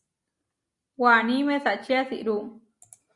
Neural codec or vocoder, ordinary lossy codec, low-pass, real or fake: none; Opus, 64 kbps; 10.8 kHz; real